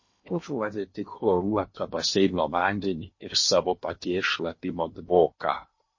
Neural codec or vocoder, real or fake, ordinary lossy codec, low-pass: codec, 16 kHz in and 24 kHz out, 0.8 kbps, FocalCodec, streaming, 65536 codes; fake; MP3, 32 kbps; 7.2 kHz